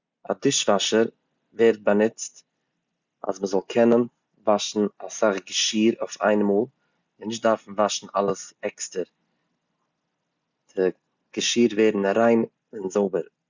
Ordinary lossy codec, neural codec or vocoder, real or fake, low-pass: Opus, 64 kbps; none; real; 7.2 kHz